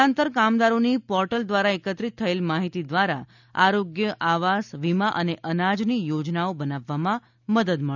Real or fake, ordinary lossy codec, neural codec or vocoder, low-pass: real; none; none; 7.2 kHz